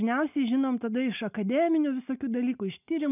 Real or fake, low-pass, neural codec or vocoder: real; 3.6 kHz; none